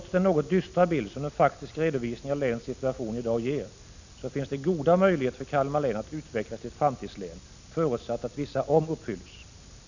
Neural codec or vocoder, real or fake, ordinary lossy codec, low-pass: none; real; none; 7.2 kHz